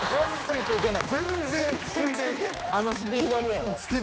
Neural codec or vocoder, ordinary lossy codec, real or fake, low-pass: codec, 16 kHz, 2 kbps, X-Codec, HuBERT features, trained on general audio; none; fake; none